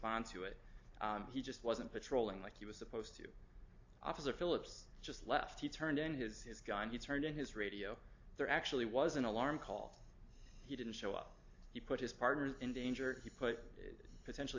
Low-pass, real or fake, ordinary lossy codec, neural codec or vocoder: 7.2 kHz; real; AAC, 48 kbps; none